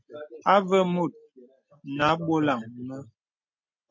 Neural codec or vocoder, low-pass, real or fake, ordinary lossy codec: none; 7.2 kHz; real; MP3, 48 kbps